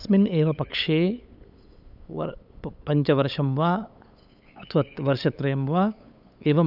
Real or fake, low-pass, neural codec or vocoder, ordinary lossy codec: fake; 5.4 kHz; codec, 16 kHz, 8 kbps, FunCodec, trained on LibriTTS, 25 frames a second; none